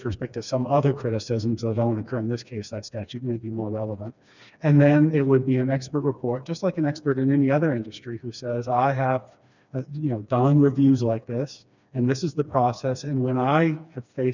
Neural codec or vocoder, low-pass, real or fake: codec, 16 kHz, 2 kbps, FreqCodec, smaller model; 7.2 kHz; fake